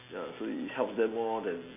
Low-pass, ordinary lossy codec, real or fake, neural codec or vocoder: 3.6 kHz; none; real; none